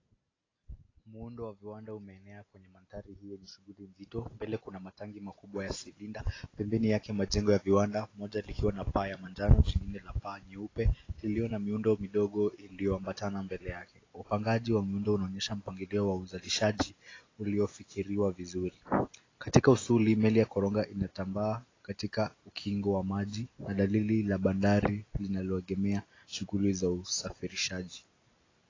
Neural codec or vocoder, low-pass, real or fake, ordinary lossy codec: none; 7.2 kHz; real; AAC, 32 kbps